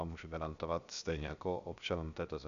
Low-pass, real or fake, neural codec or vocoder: 7.2 kHz; fake; codec, 16 kHz, 0.7 kbps, FocalCodec